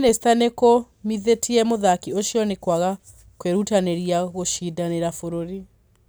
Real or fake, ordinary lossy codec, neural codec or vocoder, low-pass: real; none; none; none